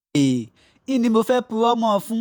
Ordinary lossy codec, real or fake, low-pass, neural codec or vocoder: none; fake; none; vocoder, 48 kHz, 128 mel bands, Vocos